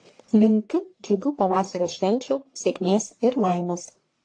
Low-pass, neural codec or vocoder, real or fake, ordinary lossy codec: 9.9 kHz; codec, 44.1 kHz, 1.7 kbps, Pupu-Codec; fake; AAC, 48 kbps